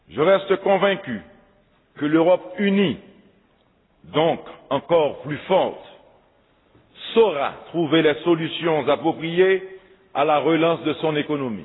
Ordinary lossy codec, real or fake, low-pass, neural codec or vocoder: AAC, 16 kbps; real; 7.2 kHz; none